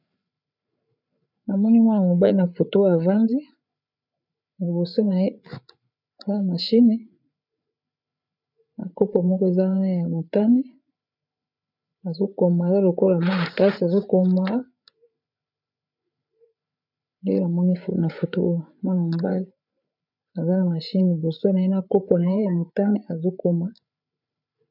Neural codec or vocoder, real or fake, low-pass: codec, 16 kHz, 8 kbps, FreqCodec, larger model; fake; 5.4 kHz